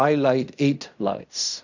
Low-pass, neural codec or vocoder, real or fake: 7.2 kHz; codec, 16 kHz in and 24 kHz out, 0.4 kbps, LongCat-Audio-Codec, fine tuned four codebook decoder; fake